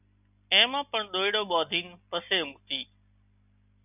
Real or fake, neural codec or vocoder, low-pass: real; none; 3.6 kHz